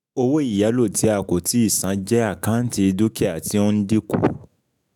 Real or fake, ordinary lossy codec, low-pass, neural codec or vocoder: fake; none; 19.8 kHz; autoencoder, 48 kHz, 128 numbers a frame, DAC-VAE, trained on Japanese speech